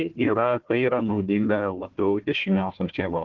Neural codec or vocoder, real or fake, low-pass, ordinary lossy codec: codec, 16 kHz, 1 kbps, FunCodec, trained on Chinese and English, 50 frames a second; fake; 7.2 kHz; Opus, 16 kbps